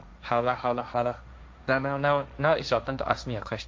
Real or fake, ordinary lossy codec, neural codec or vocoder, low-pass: fake; none; codec, 16 kHz, 1.1 kbps, Voila-Tokenizer; none